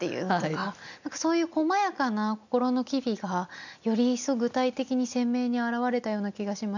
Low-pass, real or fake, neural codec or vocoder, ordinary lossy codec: 7.2 kHz; real; none; none